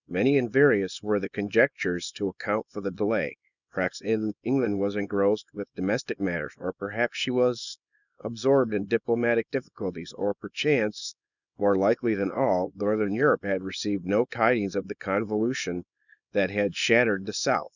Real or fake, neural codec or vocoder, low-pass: fake; codec, 24 kHz, 0.9 kbps, WavTokenizer, small release; 7.2 kHz